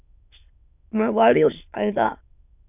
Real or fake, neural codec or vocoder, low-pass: fake; autoencoder, 22.05 kHz, a latent of 192 numbers a frame, VITS, trained on many speakers; 3.6 kHz